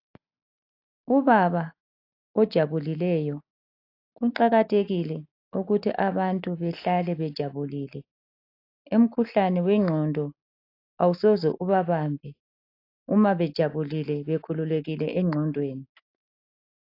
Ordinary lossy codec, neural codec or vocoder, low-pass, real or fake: AAC, 32 kbps; none; 5.4 kHz; real